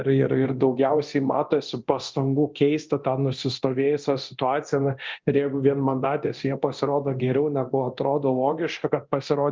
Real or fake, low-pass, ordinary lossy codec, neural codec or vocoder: fake; 7.2 kHz; Opus, 32 kbps; codec, 24 kHz, 0.9 kbps, DualCodec